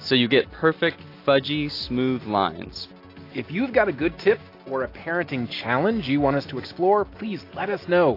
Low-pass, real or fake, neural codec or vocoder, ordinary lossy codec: 5.4 kHz; real; none; AAC, 32 kbps